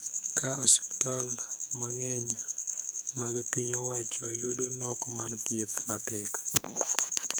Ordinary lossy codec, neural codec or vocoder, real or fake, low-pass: none; codec, 44.1 kHz, 2.6 kbps, SNAC; fake; none